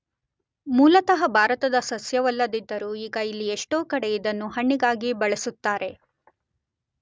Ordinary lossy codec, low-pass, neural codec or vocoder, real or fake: none; none; none; real